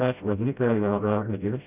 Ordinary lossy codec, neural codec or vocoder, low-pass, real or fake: none; codec, 16 kHz, 0.5 kbps, FreqCodec, smaller model; 3.6 kHz; fake